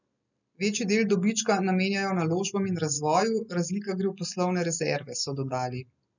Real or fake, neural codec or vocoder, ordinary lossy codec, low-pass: real; none; none; 7.2 kHz